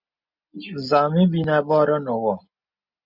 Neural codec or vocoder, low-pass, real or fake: none; 5.4 kHz; real